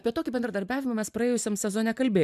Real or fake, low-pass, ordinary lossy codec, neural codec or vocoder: real; 14.4 kHz; AAC, 96 kbps; none